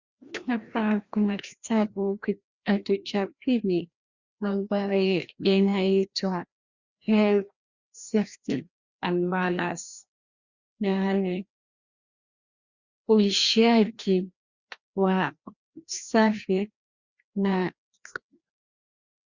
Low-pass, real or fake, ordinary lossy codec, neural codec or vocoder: 7.2 kHz; fake; Opus, 64 kbps; codec, 16 kHz, 1 kbps, FreqCodec, larger model